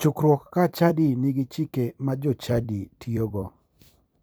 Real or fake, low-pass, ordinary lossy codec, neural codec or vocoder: fake; none; none; vocoder, 44.1 kHz, 128 mel bands every 256 samples, BigVGAN v2